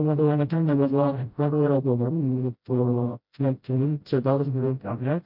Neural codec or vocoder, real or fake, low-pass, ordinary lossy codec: codec, 16 kHz, 0.5 kbps, FreqCodec, smaller model; fake; 5.4 kHz; MP3, 48 kbps